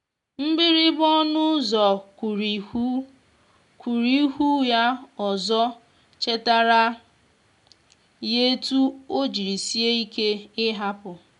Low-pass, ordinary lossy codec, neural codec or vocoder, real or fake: 10.8 kHz; none; none; real